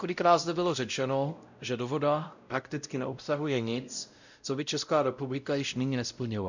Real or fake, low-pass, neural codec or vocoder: fake; 7.2 kHz; codec, 16 kHz, 0.5 kbps, X-Codec, WavLM features, trained on Multilingual LibriSpeech